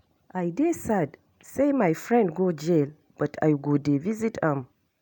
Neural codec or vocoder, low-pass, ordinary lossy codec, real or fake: none; none; none; real